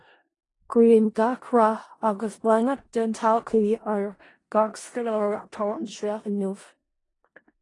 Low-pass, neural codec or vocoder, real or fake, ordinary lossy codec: 10.8 kHz; codec, 16 kHz in and 24 kHz out, 0.4 kbps, LongCat-Audio-Codec, four codebook decoder; fake; AAC, 32 kbps